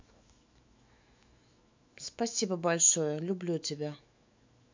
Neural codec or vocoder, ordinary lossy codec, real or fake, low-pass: autoencoder, 48 kHz, 128 numbers a frame, DAC-VAE, trained on Japanese speech; MP3, 64 kbps; fake; 7.2 kHz